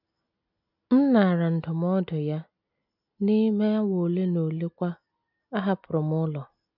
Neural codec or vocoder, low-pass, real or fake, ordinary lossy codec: none; 5.4 kHz; real; none